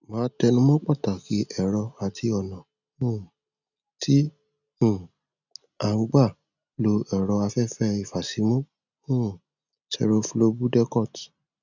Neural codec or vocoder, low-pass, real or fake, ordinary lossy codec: none; 7.2 kHz; real; none